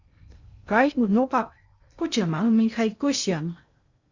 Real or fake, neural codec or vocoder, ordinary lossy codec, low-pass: fake; codec, 16 kHz in and 24 kHz out, 0.6 kbps, FocalCodec, streaming, 2048 codes; Opus, 64 kbps; 7.2 kHz